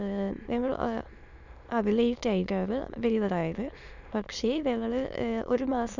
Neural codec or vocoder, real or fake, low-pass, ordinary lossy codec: autoencoder, 22.05 kHz, a latent of 192 numbers a frame, VITS, trained on many speakers; fake; 7.2 kHz; none